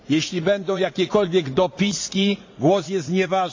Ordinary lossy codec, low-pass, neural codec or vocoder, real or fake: AAC, 48 kbps; 7.2 kHz; vocoder, 44.1 kHz, 80 mel bands, Vocos; fake